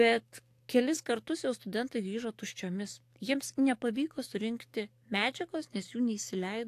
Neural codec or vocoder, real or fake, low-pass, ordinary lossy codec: codec, 44.1 kHz, 7.8 kbps, DAC; fake; 14.4 kHz; AAC, 64 kbps